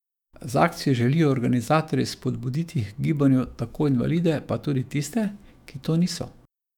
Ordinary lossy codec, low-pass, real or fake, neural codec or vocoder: none; 19.8 kHz; fake; autoencoder, 48 kHz, 128 numbers a frame, DAC-VAE, trained on Japanese speech